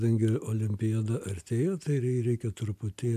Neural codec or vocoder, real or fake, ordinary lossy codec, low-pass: none; real; AAC, 96 kbps; 14.4 kHz